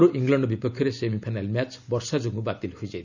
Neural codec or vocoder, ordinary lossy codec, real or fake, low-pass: none; none; real; 7.2 kHz